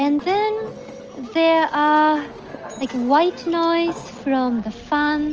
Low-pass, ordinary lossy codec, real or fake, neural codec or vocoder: 7.2 kHz; Opus, 24 kbps; real; none